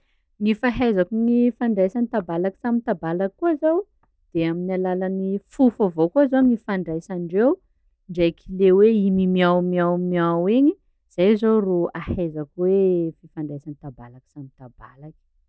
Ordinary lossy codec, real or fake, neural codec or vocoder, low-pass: none; real; none; none